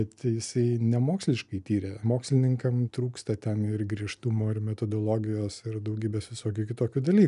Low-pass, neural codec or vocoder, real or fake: 10.8 kHz; none; real